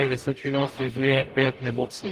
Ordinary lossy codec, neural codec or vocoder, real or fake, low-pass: Opus, 24 kbps; codec, 44.1 kHz, 0.9 kbps, DAC; fake; 14.4 kHz